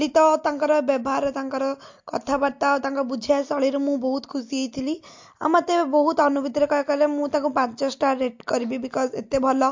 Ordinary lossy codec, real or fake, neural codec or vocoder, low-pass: MP3, 48 kbps; real; none; 7.2 kHz